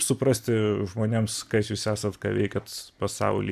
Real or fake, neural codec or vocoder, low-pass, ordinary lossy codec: real; none; 14.4 kHz; AAC, 96 kbps